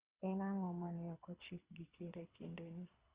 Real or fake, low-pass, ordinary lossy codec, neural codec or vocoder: fake; 3.6 kHz; Opus, 32 kbps; codec, 24 kHz, 6 kbps, HILCodec